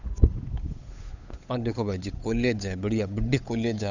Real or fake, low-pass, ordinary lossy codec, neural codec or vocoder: fake; 7.2 kHz; none; codec, 16 kHz, 8 kbps, FunCodec, trained on Chinese and English, 25 frames a second